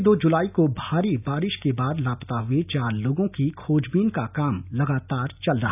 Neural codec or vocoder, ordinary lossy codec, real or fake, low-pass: none; none; real; 3.6 kHz